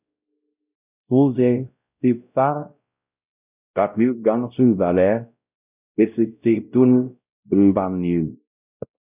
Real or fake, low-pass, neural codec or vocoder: fake; 3.6 kHz; codec, 16 kHz, 0.5 kbps, X-Codec, WavLM features, trained on Multilingual LibriSpeech